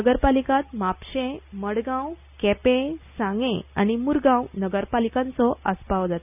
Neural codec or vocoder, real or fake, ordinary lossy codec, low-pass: none; real; none; 3.6 kHz